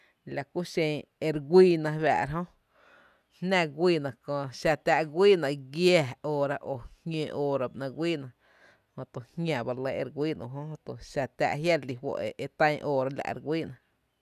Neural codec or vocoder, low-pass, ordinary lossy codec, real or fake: none; 14.4 kHz; none; real